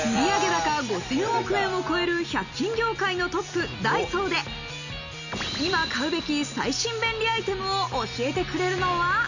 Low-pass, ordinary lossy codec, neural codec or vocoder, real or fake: 7.2 kHz; none; none; real